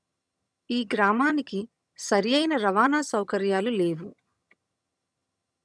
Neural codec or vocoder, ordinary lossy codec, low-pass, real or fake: vocoder, 22.05 kHz, 80 mel bands, HiFi-GAN; none; none; fake